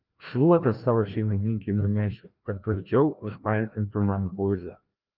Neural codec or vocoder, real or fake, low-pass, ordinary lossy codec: codec, 16 kHz, 1 kbps, FreqCodec, larger model; fake; 5.4 kHz; Opus, 32 kbps